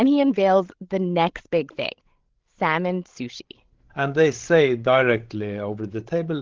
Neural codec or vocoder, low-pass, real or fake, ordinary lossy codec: codec, 16 kHz, 16 kbps, FreqCodec, larger model; 7.2 kHz; fake; Opus, 16 kbps